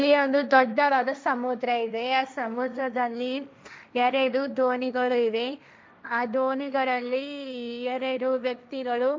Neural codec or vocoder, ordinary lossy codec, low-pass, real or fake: codec, 16 kHz, 1.1 kbps, Voila-Tokenizer; none; none; fake